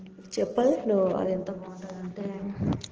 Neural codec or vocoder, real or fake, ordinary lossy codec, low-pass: none; real; Opus, 16 kbps; 7.2 kHz